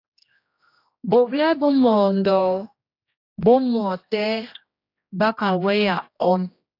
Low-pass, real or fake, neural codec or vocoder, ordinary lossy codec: 5.4 kHz; fake; codec, 16 kHz, 1 kbps, X-Codec, HuBERT features, trained on general audio; AAC, 32 kbps